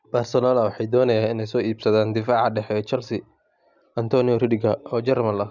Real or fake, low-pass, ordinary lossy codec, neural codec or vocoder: real; 7.2 kHz; none; none